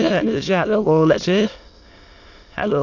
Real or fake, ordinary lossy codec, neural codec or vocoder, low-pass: fake; AAC, 48 kbps; autoencoder, 22.05 kHz, a latent of 192 numbers a frame, VITS, trained on many speakers; 7.2 kHz